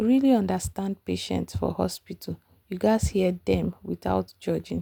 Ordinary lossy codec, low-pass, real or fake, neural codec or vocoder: none; none; real; none